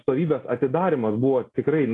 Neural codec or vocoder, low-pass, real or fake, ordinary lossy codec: none; 10.8 kHz; real; AAC, 32 kbps